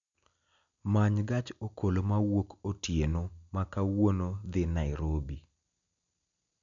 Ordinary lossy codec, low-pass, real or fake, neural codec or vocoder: none; 7.2 kHz; real; none